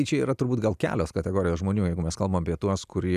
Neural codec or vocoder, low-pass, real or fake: none; 14.4 kHz; real